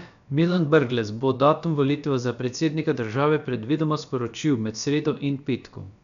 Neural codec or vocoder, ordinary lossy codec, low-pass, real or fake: codec, 16 kHz, about 1 kbps, DyCAST, with the encoder's durations; none; 7.2 kHz; fake